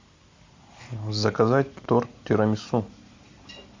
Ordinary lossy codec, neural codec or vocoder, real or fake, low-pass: MP3, 64 kbps; none; real; 7.2 kHz